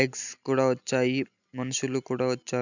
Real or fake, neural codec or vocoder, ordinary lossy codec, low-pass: real; none; none; 7.2 kHz